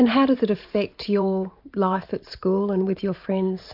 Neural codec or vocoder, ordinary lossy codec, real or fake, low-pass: vocoder, 44.1 kHz, 128 mel bands every 512 samples, BigVGAN v2; MP3, 48 kbps; fake; 5.4 kHz